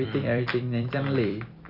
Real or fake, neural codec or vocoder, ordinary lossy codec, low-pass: fake; vocoder, 44.1 kHz, 128 mel bands every 256 samples, BigVGAN v2; none; 5.4 kHz